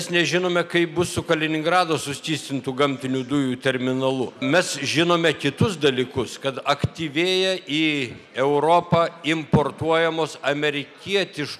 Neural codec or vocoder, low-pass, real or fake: none; 14.4 kHz; real